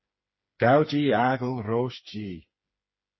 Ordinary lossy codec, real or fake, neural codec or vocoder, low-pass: MP3, 24 kbps; fake; codec, 16 kHz, 4 kbps, FreqCodec, smaller model; 7.2 kHz